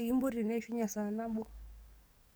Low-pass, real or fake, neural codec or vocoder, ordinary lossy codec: none; fake; codec, 44.1 kHz, 7.8 kbps, DAC; none